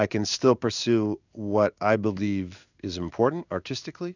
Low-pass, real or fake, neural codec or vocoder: 7.2 kHz; fake; codec, 16 kHz in and 24 kHz out, 1 kbps, XY-Tokenizer